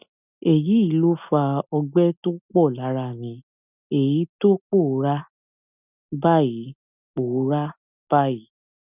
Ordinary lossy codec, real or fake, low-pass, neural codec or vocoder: none; real; 3.6 kHz; none